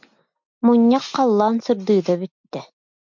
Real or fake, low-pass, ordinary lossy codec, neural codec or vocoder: real; 7.2 kHz; MP3, 64 kbps; none